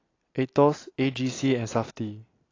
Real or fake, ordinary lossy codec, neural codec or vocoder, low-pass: real; AAC, 32 kbps; none; 7.2 kHz